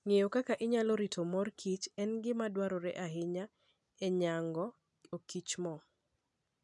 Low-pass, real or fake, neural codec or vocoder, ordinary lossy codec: 10.8 kHz; real; none; none